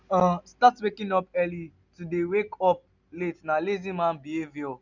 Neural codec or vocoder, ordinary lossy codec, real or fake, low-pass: none; none; real; 7.2 kHz